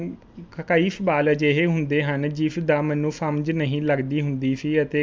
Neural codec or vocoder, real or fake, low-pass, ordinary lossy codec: none; real; none; none